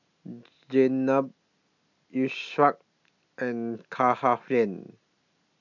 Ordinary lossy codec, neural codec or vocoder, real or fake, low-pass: none; none; real; 7.2 kHz